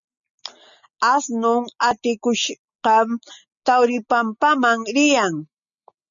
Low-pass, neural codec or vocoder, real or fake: 7.2 kHz; none; real